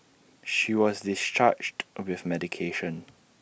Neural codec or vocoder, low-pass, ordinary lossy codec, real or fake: none; none; none; real